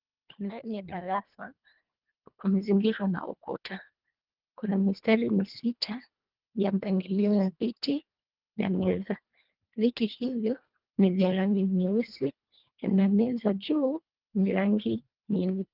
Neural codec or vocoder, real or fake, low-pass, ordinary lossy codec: codec, 24 kHz, 1.5 kbps, HILCodec; fake; 5.4 kHz; Opus, 24 kbps